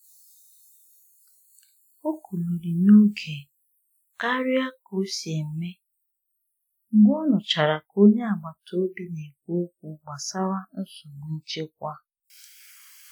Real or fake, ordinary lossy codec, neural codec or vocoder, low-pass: real; none; none; none